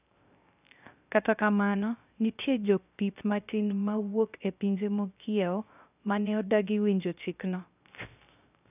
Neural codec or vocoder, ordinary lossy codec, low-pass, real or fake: codec, 16 kHz, 0.3 kbps, FocalCodec; none; 3.6 kHz; fake